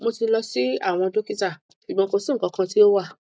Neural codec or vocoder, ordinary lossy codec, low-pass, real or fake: none; none; none; real